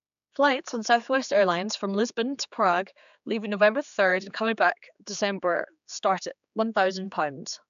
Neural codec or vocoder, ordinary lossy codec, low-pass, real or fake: codec, 16 kHz, 4 kbps, X-Codec, HuBERT features, trained on general audio; AAC, 96 kbps; 7.2 kHz; fake